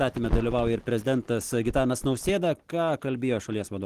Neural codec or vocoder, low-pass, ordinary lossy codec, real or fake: none; 14.4 kHz; Opus, 16 kbps; real